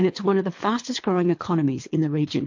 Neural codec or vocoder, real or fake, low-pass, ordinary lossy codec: codec, 24 kHz, 3 kbps, HILCodec; fake; 7.2 kHz; MP3, 48 kbps